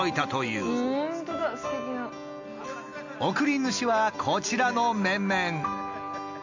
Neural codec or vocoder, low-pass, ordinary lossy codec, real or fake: none; 7.2 kHz; MP3, 48 kbps; real